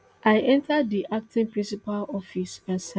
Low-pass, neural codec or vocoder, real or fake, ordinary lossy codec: none; none; real; none